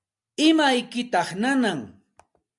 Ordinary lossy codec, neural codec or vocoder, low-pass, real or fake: MP3, 96 kbps; none; 10.8 kHz; real